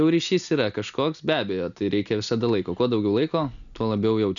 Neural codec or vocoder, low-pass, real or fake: none; 7.2 kHz; real